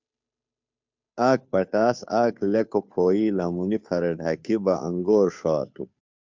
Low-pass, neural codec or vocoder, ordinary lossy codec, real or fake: 7.2 kHz; codec, 16 kHz, 2 kbps, FunCodec, trained on Chinese and English, 25 frames a second; MP3, 64 kbps; fake